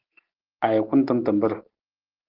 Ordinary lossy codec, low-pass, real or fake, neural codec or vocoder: Opus, 16 kbps; 5.4 kHz; real; none